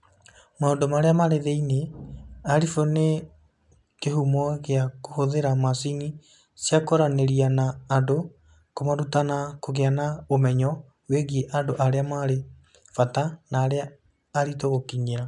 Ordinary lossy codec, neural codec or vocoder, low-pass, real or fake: MP3, 96 kbps; none; 10.8 kHz; real